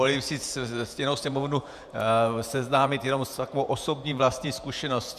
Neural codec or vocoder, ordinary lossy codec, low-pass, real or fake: vocoder, 48 kHz, 128 mel bands, Vocos; MP3, 96 kbps; 14.4 kHz; fake